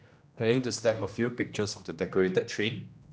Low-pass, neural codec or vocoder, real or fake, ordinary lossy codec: none; codec, 16 kHz, 1 kbps, X-Codec, HuBERT features, trained on general audio; fake; none